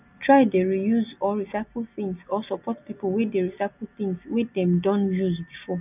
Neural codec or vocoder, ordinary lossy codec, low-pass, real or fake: none; none; 3.6 kHz; real